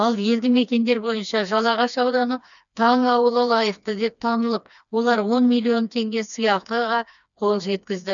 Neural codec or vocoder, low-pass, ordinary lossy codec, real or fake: codec, 16 kHz, 2 kbps, FreqCodec, smaller model; 7.2 kHz; none; fake